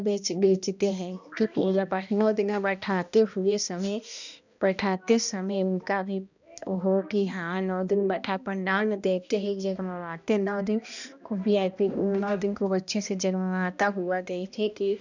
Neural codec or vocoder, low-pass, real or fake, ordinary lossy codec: codec, 16 kHz, 1 kbps, X-Codec, HuBERT features, trained on balanced general audio; 7.2 kHz; fake; none